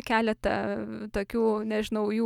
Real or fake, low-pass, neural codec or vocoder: real; 19.8 kHz; none